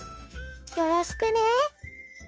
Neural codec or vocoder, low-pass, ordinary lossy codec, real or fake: codec, 16 kHz, 0.9 kbps, LongCat-Audio-Codec; none; none; fake